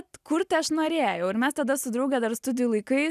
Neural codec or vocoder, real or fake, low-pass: none; real; 14.4 kHz